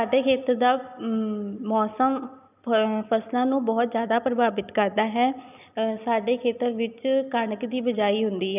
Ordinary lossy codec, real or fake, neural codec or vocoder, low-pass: none; real; none; 3.6 kHz